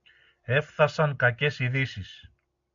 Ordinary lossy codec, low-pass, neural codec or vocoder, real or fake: AAC, 64 kbps; 7.2 kHz; none; real